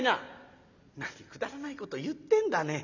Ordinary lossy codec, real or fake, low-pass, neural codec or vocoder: none; real; 7.2 kHz; none